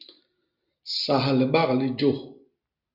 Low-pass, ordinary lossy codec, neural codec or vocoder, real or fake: 5.4 kHz; Opus, 64 kbps; none; real